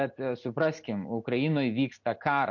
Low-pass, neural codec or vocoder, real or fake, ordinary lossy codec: 7.2 kHz; none; real; MP3, 48 kbps